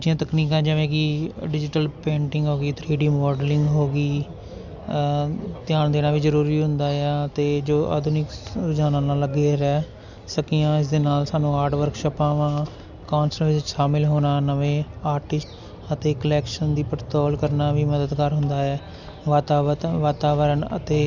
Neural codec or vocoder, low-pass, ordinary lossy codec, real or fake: none; 7.2 kHz; none; real